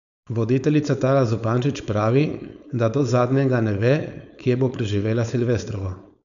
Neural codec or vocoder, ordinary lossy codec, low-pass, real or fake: codec, 16 kHz, 4.8 kbps, FACodec; none; 7.2 kHz; fake